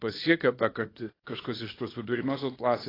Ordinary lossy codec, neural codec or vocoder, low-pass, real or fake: AAC, 24 kbps; codec, 24 kHz, 0.9 kbps, WavTokenizer, small release; 5.4 kHz; fake